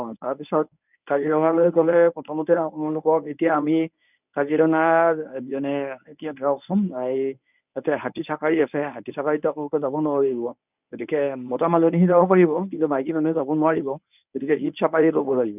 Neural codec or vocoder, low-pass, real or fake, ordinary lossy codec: codec, 24 kHz, 0.9 kbps, WavTokenizer, medium speech release version 1; 3.6 kHz; fake; none